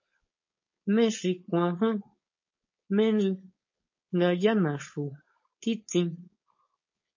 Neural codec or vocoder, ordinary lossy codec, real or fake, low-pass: codec, 16 kHz, 4.8 kbps, FACodec; MP3, 32 kbps; fake; 7.2 kHz